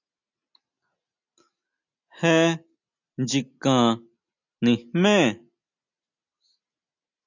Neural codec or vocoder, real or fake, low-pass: none; real; 7.2 kHz